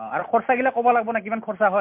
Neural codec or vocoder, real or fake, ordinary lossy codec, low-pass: none; real; MP3, 32 kbps; 3.6 kHz